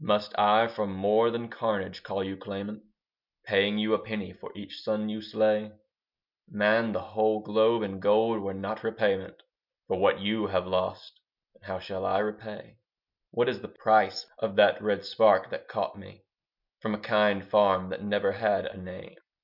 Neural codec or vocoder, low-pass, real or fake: none; 5.4 kHz; real